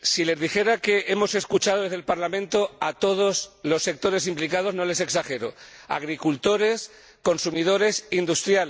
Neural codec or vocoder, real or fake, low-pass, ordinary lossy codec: none; real; none; none